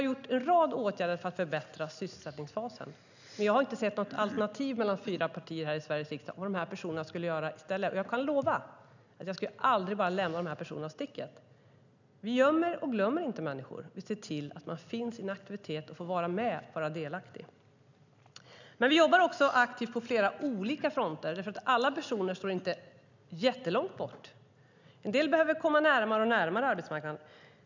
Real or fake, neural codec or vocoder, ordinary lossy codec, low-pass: real; none; none; 7.2 kHz